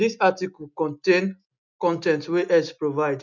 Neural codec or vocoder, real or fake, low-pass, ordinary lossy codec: none; real; 7.2 kHz; none